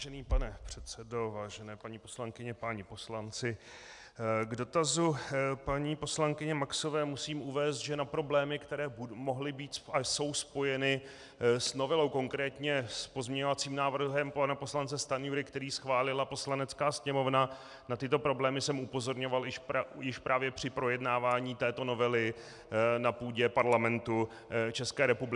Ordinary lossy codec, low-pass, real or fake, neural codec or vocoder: MP3, 96 kbps; 10.8 kHz; real; none